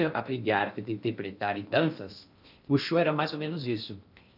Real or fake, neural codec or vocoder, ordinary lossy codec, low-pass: fake; codec, 16 kHz in and 24 kHz out, 0.6 kbps, FocalCodec, streaming, 2048 codes; none; 5.4 kHz